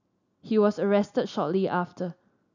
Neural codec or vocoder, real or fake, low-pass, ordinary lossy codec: none; real; 7.2 kHz; none